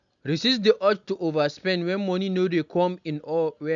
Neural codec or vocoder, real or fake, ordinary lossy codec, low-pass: none; real; none; 7.2 kHz